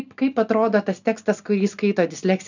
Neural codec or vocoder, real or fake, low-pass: none; real; 7.2 kHz